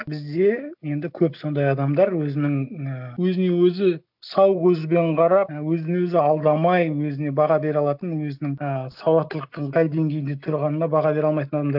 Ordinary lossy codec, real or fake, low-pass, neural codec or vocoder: none; real; 5.4 kHz; none